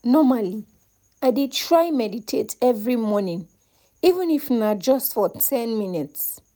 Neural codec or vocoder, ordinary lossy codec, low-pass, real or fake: none; none; none; real